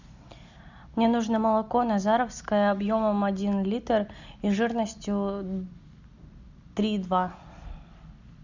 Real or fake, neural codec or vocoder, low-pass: real; none; 7.2 kHz